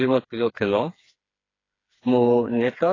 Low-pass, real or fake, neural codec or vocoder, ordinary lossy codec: 7.2 kHz; fake; codec, 16 kHz, 4 kbps, FreqCodec, smaller model; AAC, 32 kbps